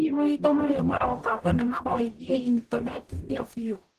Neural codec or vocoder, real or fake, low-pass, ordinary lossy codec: codec, 44.1 kHz, 0.9 kbps, DAC; fake; 14.4 kHz; Opus, 16 kbps